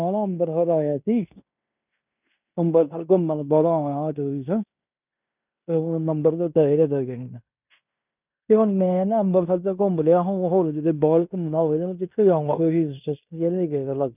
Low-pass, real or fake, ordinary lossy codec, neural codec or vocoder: 3.6 kHz; fake; none; codec, 16 kHz in and 24 kHz out, 0.9 kbps, LongCat-Audio-Codec, fine tuned four codebook decoder